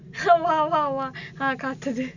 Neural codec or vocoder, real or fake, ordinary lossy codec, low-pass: none; real; none; 7.2 kHz